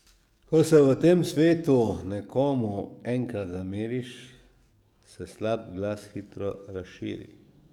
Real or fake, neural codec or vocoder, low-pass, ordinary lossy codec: fake; codec, 44.1 kHz, 7.8 kbps, DAC; 19.8 kHz; none